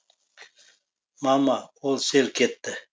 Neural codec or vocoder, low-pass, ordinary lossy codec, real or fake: none; none; none; real